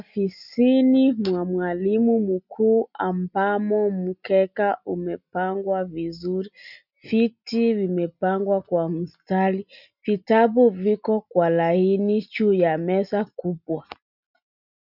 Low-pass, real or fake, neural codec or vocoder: 5.4 kHz; real; none